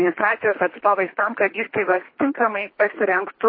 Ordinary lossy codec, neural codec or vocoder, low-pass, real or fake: MP3, 24 kbps; codec, 24 kHz, 3 kbps, HILCodec; 5.4 kHz; fake